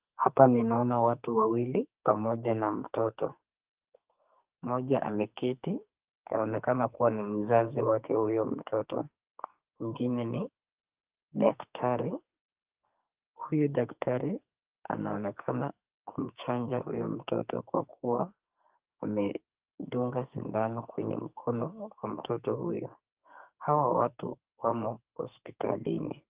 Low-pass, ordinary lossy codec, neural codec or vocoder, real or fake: 3.6 kHz; Opus, 24 kbps; codec, 44.1 kHz, 2.6 kbps, SNAC; fake